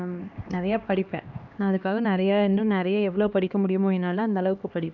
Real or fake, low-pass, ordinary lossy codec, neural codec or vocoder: fake; 7.2 kHz; none; codec, 16 kHz, 2 kbps, X-Codec, HuBERT features, trained on LibriSpeech